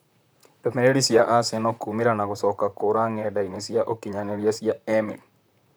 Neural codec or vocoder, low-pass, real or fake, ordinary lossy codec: vocoder, 44.1 kHz, 128 mel bands, Pupu-Vocoder; none; fake; none